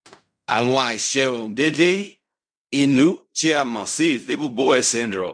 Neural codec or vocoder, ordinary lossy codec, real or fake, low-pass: codec, 16 kHz in and 24 kHz out, 0.4 kbps, LongCat-Audio-Codec, fine tuned four codebook decoder; MP3, 96 kbps; fake; 9.9 kHz